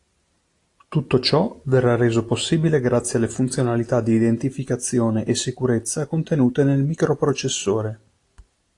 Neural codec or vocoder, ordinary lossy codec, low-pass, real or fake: none; AAC, 48 kbps; 10.8 kHz; real